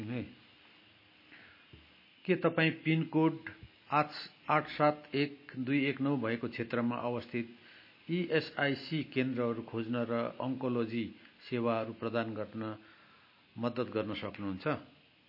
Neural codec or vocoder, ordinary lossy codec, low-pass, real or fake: none; MP3, 24 kbps; 5.4 kHz; real